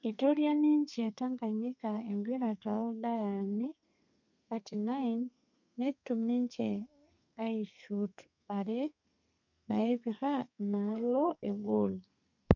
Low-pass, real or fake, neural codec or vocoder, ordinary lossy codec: 7.2 kHz; fake; codec, 32 kHz, 1.9 kbps, SNAC; none